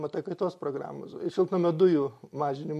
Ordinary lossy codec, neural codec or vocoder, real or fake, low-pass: MP3, 64 kbps; none; real; 14.4 kHz